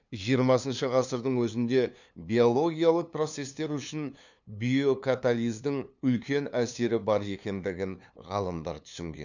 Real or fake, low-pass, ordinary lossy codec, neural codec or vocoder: fake; 7.2 kHz; none; codec, 16 kHz, 2 kbps, FunCodec, trained on LibriTTS, 25 frames a second